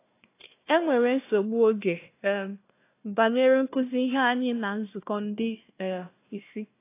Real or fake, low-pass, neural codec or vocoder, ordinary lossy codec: fake; 3.6 kHz; codec, 16 kHz, 1 kbps, FunCodec, trained on Chinese and English, 50 frames a second; AAC, 24 kbps